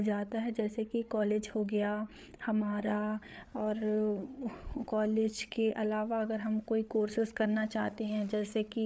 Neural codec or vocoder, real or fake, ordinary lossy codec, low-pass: codec, 16 kHz, 8 kbps, FreqCodec, larger model; fake; none; none